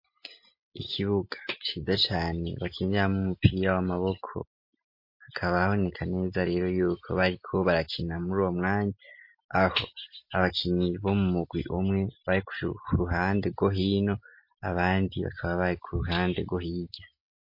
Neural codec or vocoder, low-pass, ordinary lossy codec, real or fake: none; 5.4 kHz; MP3, 32 kbps; real